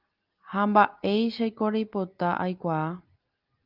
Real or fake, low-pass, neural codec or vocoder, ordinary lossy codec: real; 5.4 kHz; none; Opus, 32 kbps